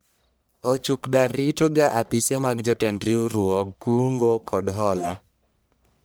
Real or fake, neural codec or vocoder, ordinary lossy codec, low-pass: fake; codec, 44.1 kHz, 1.7 kbps, Pupu-Codec; none; none